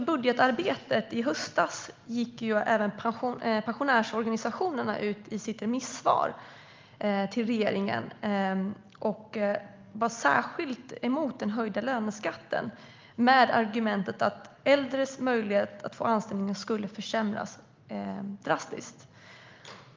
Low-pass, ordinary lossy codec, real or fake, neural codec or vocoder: 7.2 kHz; Opus, 24 kbps; real; none